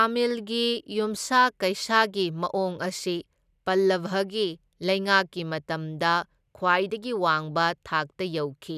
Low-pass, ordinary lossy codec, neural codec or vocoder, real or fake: 14.4 kHz; none; none; real